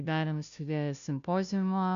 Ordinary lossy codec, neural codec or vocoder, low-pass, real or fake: AAC, 96 kbps; codec, 16 kHz, 0.5 kbps, FunCodec, trained on Chinese and English, 25 frames a second; 7.2 kHz; fake